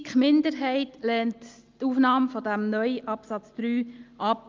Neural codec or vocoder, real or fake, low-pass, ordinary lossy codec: none; real; 7.2 kHz; Opus, 24 kbps